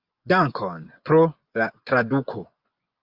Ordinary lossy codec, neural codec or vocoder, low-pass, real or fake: Opus, 24 kbps; vocoder, 24 kHz, 100 mel bands, Vocos; 5.4 kHz; fake